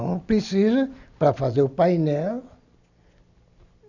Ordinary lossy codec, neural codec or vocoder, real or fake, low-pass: none; none; real; 7.2 kHz